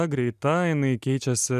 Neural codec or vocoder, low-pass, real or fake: none; 14.4 kHz; real